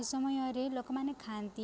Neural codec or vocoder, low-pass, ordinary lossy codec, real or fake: none; none; none; real